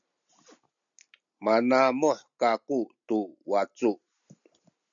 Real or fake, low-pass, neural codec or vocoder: real; 7.2 kHz; none